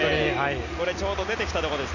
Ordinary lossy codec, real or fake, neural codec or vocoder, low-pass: none; real; none; 7.2 kHz